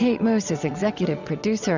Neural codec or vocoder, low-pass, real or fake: vocoder, 22.05 kHz, 80 mel bands, WaveNeXt; 7.2 kHz; fake